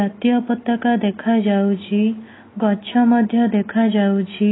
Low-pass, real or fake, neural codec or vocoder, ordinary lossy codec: 7.2 kHz; real; none; AAC, 16 kbps